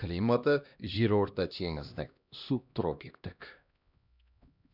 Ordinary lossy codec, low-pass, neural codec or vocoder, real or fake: none; 5.4 kHz; codec, 16 kHz, 1 kbps, X-Codec, HuBERT features, trained on LibriSpeech; fake